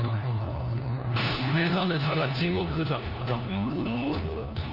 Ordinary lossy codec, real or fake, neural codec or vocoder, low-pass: Opus, 32 kbps; fake; codec, 16 kHz, 1 kbps, FunCodec, trained on LibriTTS, 50 frames a second; 5.4 kHz